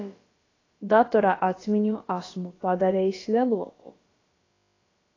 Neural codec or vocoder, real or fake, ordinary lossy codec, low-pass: codec, 16 kHz, about 1 kbps, DyCAST, with the encoder's durations; fake; AAC, 32 kbps; 7.2 kHz